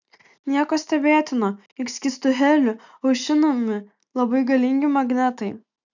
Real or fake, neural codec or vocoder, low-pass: real; none; 7.2 kHz